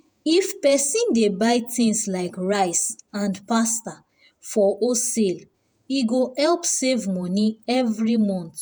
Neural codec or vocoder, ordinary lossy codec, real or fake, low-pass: vocoder, 48 kHz, 128 mel bands, Vocos; none; fake; none